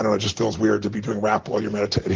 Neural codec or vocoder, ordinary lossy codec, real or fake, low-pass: none; Opus, 16 kbps; real; 7.2 kHz